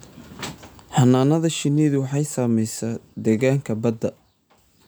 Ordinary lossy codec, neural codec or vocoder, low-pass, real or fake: none; none; none; real